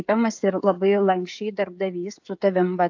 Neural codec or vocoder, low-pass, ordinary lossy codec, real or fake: codec, 16 kHz, 16 kbps, FreqCodec, smaller model; 7.2 kHz; AAC, 48 kbps; fake